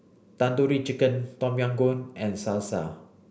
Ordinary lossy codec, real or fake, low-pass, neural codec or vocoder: none; real; none; none